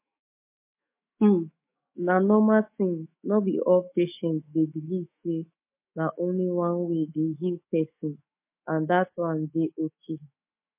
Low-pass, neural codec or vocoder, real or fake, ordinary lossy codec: 3.6 kHz; autoencoder, 48 kHz, 128 numbers a frame, DAC-VAE, trained on Japanese speech; fake; MP3, 24 kbps